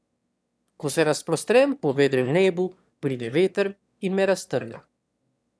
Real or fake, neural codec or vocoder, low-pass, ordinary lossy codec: fake; autoencoder, 22.05 kHz, a latent of 192 numbers a frame, VITS, trained on one speaker; none; none